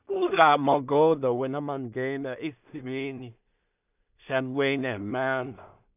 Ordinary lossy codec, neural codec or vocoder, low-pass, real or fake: none; codec, 16 kHz in and 24 kHz out, 0.4 kbps, LongCat-Audio-Codec, two codebook decoder; 3.6 kHz; fake